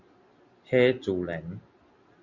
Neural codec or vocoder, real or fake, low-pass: none; real; 7.2 kHz